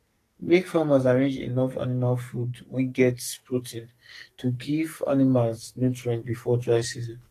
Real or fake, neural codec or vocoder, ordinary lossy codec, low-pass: fake; codec, 44.1 kHz, 2.6 kbps, SNAC; AAC, 48 kbps; 14.4 kHz